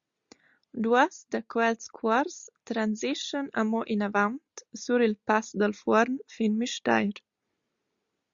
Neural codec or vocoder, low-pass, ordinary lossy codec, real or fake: none; 7.2 kHz; Opus, 64 kbps; real